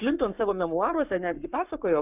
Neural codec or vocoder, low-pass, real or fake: codec, 44.1 kHz, 7.8 kbps, Pupu-Codec; 3.6 kHz; fake